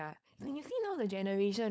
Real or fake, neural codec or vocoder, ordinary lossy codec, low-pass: fake; codec, 16 kHz, 4.8 kbps, FACodec; none; none